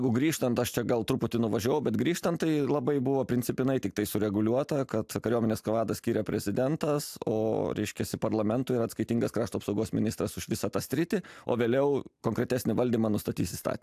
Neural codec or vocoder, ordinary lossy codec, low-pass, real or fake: none; AAC, 96 kbps; 14.4 kHz; real